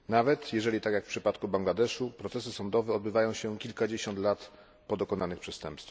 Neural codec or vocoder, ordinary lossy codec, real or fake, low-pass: none; none; real; none